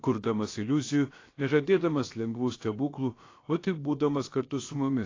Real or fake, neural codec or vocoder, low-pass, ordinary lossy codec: fake; codec, 16 kHz, about 1 kbps, DyCAST, with the encoder's durations; 7.2 kHz; AAC, 32 kbps